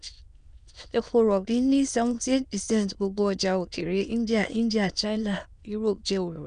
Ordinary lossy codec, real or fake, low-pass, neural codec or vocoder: none; fake; 9.9 kHz; autoencoder, 22.05 kHz, a latent of 192 numbers a frame, VITS, trained on many speakers